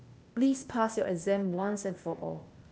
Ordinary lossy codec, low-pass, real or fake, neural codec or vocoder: none; none; fake; codec, 16 kHz, 0.8 kbps, ZipCodec